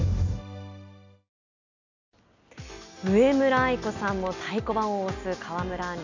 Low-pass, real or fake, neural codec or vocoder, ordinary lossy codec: 7.2 kHz; real; none; none